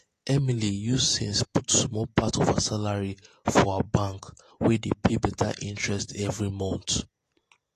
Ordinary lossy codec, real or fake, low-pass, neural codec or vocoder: AAC, 48 kbps; fake; 14.4 kHz; vocoder, 48 kHz, 128 mel bands, Vocos